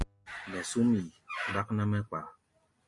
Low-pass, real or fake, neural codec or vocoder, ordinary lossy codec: 10.8 kHz; real; none; MP3, 48 kbps